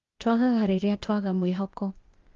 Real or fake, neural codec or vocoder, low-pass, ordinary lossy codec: fake; codec, 16 kHz, 0.8 kbps, ZipCodec; 7.2 kHz; Opus, 32 kbps